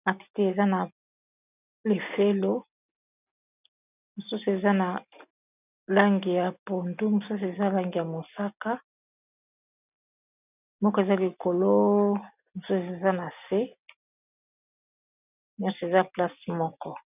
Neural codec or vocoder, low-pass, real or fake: none; 3.6 kHz; real